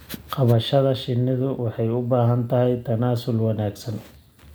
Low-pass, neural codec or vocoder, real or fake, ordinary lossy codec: none; none; real; none